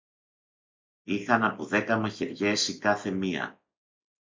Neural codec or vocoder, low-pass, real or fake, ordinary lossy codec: none; 7.2 kHz; real; MP3, 48 kbps